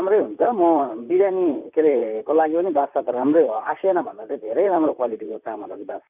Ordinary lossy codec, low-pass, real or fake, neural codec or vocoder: none; 3.6 kHz; fake; vocoder, 44.1 kHz, 128 mel bands, Pupu-Vocoder